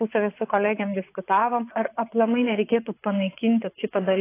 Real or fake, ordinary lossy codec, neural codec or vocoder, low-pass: fake; AAC, 24 kbps; vocoder, 24 kHz, 100 mel bands, Vocos; 3.6 kHz